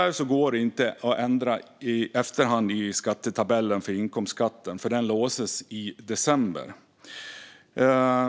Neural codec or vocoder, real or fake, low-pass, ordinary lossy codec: none; real; none; none